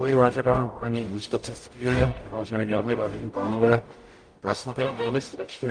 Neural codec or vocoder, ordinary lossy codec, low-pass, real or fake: codec, 44.1 kHz, 0.9 kbps, DAC; Opus, 32 kbps; 9.9 kHz; fake